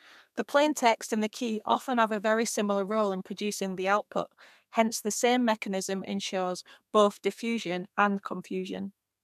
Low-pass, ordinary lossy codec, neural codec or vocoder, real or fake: 14.4 kHz; none; codec, 32 kHz, 1.9 kbps, SNAC; fake